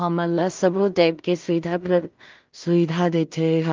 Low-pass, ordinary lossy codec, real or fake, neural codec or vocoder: 7.2 kHz; Opus, 24 kbps; fake; codec, 16 kHz in and 24 kHz out, 0.4 kbps, LongCat-Audio-Codec, two codebook decoder